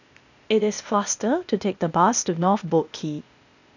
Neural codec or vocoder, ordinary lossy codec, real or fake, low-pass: codec, 16 kHz, 0.8 kbps, ZipCodec; none; fake; 7.2 kHz